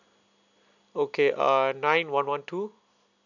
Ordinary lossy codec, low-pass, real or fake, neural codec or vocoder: none; 7.2 kHz; real; none